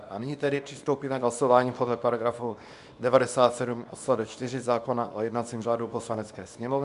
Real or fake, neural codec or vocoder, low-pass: fake; codec, 24 kHz, 0.9 kbps, WavTokenizer, small release; 10.8 kHz